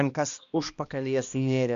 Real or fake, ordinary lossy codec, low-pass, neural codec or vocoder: fake; MP3, 64 kbps; 7.2 kHz; codec, 16 kHz, 1 kbps, X-Codec, HuBERT features, trained on balanced general audio